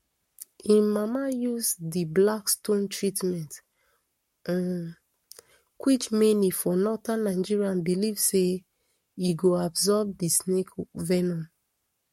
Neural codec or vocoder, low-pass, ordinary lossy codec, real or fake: codec, 44.1 kHz, 7.8 kbps, Pupu-Codec; 19.8 kHz; MP3, 64 kbps; fake